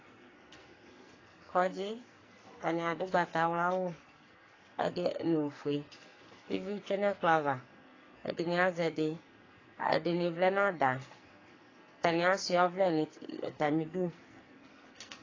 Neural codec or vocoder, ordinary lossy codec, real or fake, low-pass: codec, 44.1 kHz, 2.6 kbps, SNAC; AAC, 32 kbps; fake; 7.2 kHz